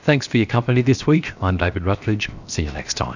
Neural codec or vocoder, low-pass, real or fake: codec, 16 kHz, 0.7 kbps, FocalCodec; 7.2 kHz; fake